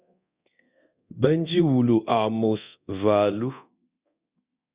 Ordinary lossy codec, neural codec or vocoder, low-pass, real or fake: Opus, 64 kbps; codec, 24 kHz, 0.9 kbps, DualCodec; 3.6 kHz; fake